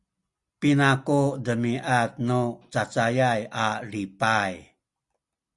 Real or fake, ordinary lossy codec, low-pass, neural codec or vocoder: real; Opus, 64 kbps; 10.8 kHz; none